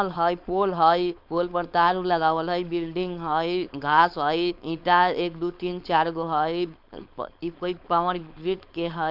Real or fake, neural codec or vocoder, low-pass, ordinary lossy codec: fake; codec, 16 kHz, 4.8 kbps, FACodec; 5.4 kHz; none